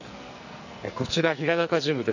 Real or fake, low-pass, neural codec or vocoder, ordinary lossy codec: fake; 7.2 kHz; codec, 44.1 kHz, 2.6 kbps, SNAC; none